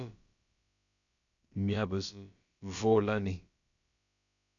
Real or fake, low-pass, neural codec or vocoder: fake; 7.2 kHz; codec, 16 kHz, about 1 kbps, DyCAST, with the encoder's durations